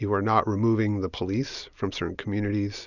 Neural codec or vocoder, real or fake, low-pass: none; real; 7.2 kHz